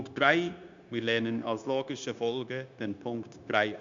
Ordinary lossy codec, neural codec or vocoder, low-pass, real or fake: Opus, 64 kbps; codec, 16 kHz, 0.9 kbps, LongCat-Audio-Codec; 7.2 kHz; fake